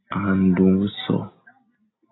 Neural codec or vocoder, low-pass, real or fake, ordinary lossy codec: none; 7.2 kHz; real; AAC, 16 kbps